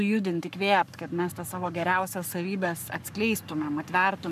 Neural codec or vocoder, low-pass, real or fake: codec, 44.1 kHz, 7.8 kbps, Pupu-Codec; 14.4 kHz; fake